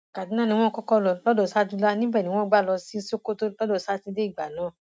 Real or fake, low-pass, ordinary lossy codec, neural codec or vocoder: real; none; none; none